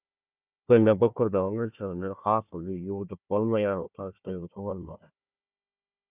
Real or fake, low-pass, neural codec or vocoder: fake; 3.6 kHz; codec, 16 kHz, 1 kbps, FunCodec, trained on Chinese and English, 50 frames a second